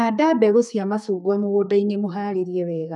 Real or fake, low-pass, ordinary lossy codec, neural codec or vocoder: fake; 10.8 kHz; none; codec, 32 kHz, 1.9 kbps, SNAC